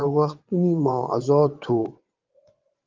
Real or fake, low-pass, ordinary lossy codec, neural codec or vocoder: fake; 7.2 kHz; Opus, 32 kbps; vocoder, 22.05 kHz, 80 mel bands, WaveNeXt